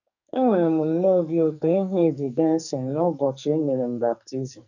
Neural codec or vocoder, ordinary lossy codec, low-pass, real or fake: codec, 44.1 kHz, 2.6 kbps, SNAC; none; 7.2 kHz; fake